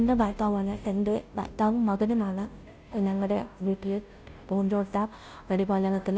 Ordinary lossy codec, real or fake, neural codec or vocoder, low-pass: none; fake; codec, 16 kHz, 0.5 kbps, FunCodec, trained on Chinese and English, 25 frames a second; none